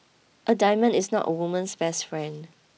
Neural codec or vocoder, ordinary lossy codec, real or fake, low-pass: none; none; real; none